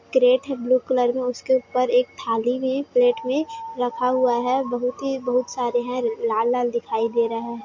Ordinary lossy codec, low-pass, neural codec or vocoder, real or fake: MP3, 48 kbps; 7.2 kHz; none; real